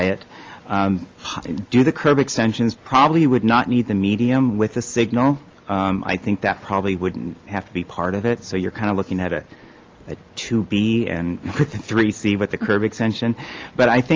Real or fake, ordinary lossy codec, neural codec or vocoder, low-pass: real; Opus, 32 kbps; none; 7.2 kHz